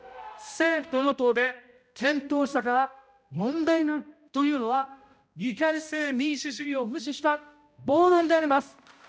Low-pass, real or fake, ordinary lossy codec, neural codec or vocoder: none; fake; none; codec, 16 kHz, 0.5 kbps, X-Codec, HuBERT features, trained on balanced general audio